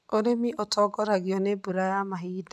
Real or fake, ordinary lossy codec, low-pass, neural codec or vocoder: real; none; none; none